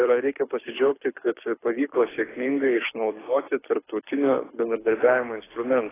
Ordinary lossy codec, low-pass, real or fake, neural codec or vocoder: AAC, 16 kbps; 3.6 kHz; fake; codec, 24 kHz, 6 kbps, HILCodec